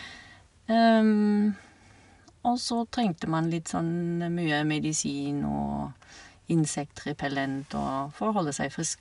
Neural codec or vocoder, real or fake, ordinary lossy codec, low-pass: none; real; none; 10.8 kHz